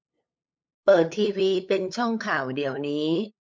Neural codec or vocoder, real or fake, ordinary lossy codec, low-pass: codec, 16 kHz, 8 kbps, FunCodec, trained on LibriTTS, 25 frames a second; fake; none; none